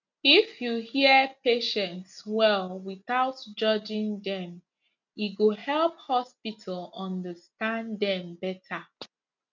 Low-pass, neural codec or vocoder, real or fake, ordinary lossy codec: 7.2 kHz; none; real; none